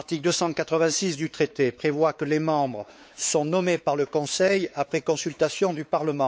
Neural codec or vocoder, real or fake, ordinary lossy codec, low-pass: codec, 16 kHz, 4 kbps, X-Codec, WavLM features, trained on Multilingual LibriSpeech; fake; none; none